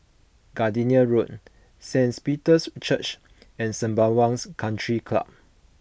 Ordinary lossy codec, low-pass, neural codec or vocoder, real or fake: none; none; none; real